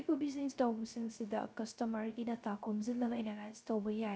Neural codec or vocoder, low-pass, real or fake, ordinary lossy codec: codec, 16 kHz, 0.3 kbps, FocalCodec; none; fake; none